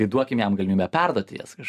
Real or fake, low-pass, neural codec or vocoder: real; 14.4 kHz; none